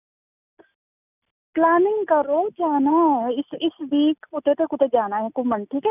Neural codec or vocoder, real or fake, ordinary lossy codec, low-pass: none; real; none; 3.6 kHz